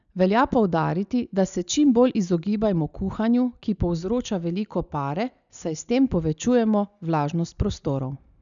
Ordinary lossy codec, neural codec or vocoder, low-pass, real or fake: none; none; 7.2 kHz; real